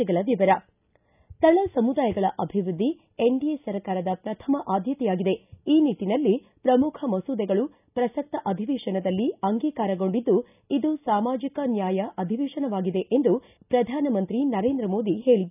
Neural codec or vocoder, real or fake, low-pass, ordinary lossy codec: none; real; 3.6 kHz; none